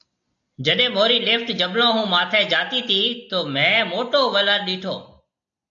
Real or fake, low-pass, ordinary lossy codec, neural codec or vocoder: real; 7.2 kHz; AAC, 64 kbps; none